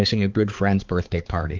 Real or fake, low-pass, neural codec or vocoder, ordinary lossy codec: fake; 7.2 kHz; codec, 16 kHz, 4 kbps, X-Codec, HuBERT features, trained on balanced general audio; Opus, 24 kbps